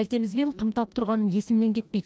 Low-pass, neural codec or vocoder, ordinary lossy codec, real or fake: none; codec, 16 kHz, 1 kbps, FreqCodec, larger model; none; fake